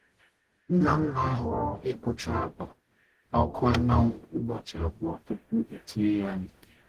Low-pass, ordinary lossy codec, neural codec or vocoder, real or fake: 14.4 kHz; Opus, 16 kbps; codec, 44.1 kHz, 0.9 kbps, DAC; fake